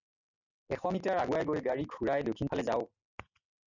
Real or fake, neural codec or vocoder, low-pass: real; none; 7.2 kHz